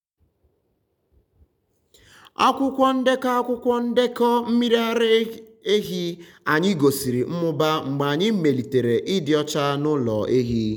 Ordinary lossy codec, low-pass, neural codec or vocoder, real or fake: none; 19.8 kHz; none; real